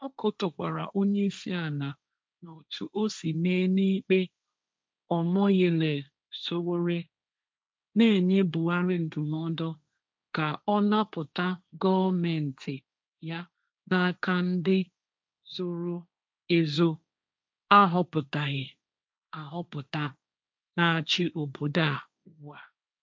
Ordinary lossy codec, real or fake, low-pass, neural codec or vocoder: none; fake; none; codec, 16 kHz, 1.1 kbps, Voila-Tokenizer